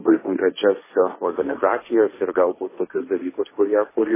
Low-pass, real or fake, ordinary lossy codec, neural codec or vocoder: 3.6 kHz; fake; MP3, 16 kbps; codec, 16 kHz, 1.1 kbps, Voila-Tokenizer